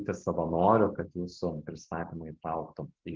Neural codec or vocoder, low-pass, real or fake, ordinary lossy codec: none; 7.2 kHz; real; Opus, 16 kbps